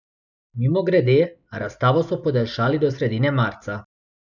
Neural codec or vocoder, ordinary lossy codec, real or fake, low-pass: none; none; real; 7.2 kHz